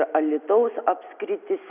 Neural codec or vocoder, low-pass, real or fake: none; 3.6 kHz; real